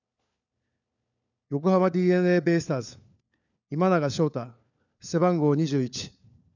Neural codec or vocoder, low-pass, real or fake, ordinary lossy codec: codec, 16 kHz, 4 kbps, FunCodec, trained on LibriTTS, 50 frames a second; 7.2 kHz; fake; none